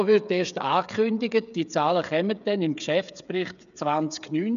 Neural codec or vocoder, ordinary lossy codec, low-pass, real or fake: codec, 16 kHz, 8 kbps, FreqCodec, smaller model; none; 7.2 kHz; fake